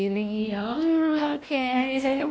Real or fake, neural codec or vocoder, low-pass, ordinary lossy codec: fake; codec, 16 kHz, 1 kbps, X-Codec, WavLM features, trained on Multilingual LibriSpeech; none; none